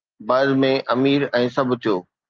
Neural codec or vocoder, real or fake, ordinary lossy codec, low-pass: none; real; Opus, 24 kbps; 7.2 kHz